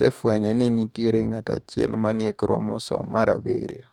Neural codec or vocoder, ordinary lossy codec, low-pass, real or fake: codec, 44.1 kHz, 2.6 kbps, DAC; none; 19.8 kHz; fake